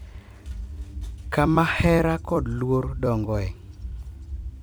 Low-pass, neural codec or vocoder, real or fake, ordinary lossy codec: none; vocoder, 44.1 kHz, 128 mel bands every 256 samples, BigVGAN v2; fake; none